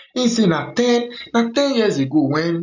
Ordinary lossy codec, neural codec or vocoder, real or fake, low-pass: none; none; real; 7.2 kHz